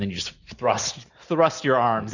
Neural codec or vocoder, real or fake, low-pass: none; real; 7.2 kHz